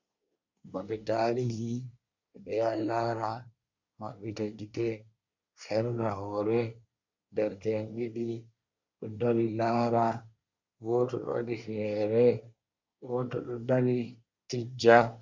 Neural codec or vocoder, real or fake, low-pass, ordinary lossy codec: codec, 24 kHz, 1 kbps, SNAC; fake; 7.2 kHz; MP3, 64 kbps